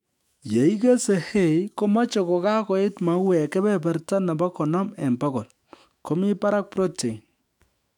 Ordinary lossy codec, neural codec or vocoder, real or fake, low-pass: none; autoencoder, 48 kHz, 128 numbers a frame, DAC-VAE, trained on Japanese speech; fake; 19.8 kHz